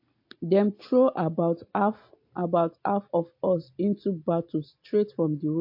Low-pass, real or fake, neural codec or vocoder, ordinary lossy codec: 5.4 kHz; real; none; MP3, 32 kbps